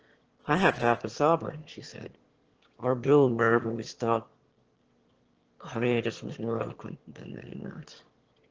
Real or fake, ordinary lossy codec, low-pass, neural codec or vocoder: fake; Opus, 16 kbps; 7.2 kHz; autoencoder, 22.05 kHz, a latent of 192 numbers a frame, VITS, trained on one speaker